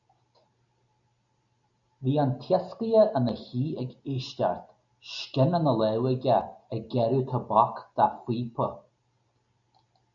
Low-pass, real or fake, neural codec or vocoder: 7.2 kHz; real; none